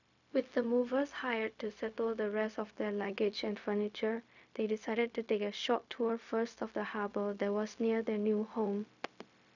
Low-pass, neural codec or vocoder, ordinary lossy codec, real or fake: 7.2 kHz; codec, 16 kHz, 0.4 kbps, LongCat-Audio-Codec; none; fake